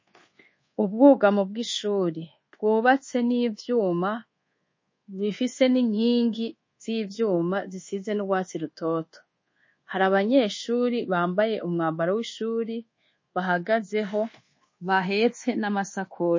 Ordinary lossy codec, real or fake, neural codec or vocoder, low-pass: MP3, 32 kbps; fake; codec, 24 kHz, 1.2 kbps, DualCodec; 7.2 kHz